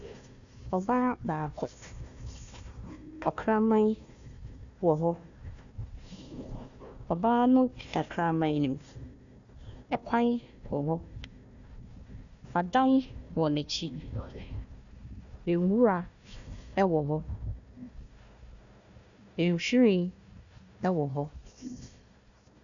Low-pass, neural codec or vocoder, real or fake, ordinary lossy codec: 7.2 kHz; codec, 16 kHz, 1 kbps, FunCodec, trained on Chinese and English, 50 frames a second; fake; MP3, 96 kbps